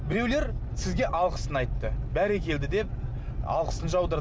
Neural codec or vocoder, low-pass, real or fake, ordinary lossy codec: none; none; real; none